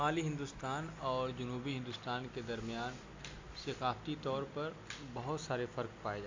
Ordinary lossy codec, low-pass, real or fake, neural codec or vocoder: MP3, 48 kbps; 7.2 kHz; real; none